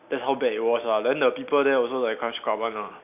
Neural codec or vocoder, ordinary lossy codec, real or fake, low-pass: none; none; real; 3.6 kHz